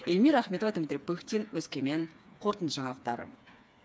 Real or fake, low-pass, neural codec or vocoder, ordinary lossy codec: fake; none; codec, 16 kHz, 4 kbps, FreqCodec, smaller model; none